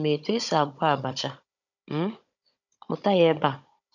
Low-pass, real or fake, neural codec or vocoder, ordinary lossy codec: 7.2 kHz; fake; codec, 16 kHz, 16 kbps, FunCodec, trained on Chinese and English, 50 frames a second; none